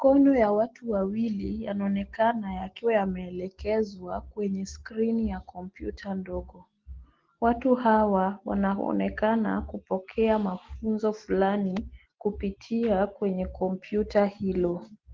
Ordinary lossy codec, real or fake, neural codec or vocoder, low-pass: Opus, 16 kbps; real; none; 7.2 kHz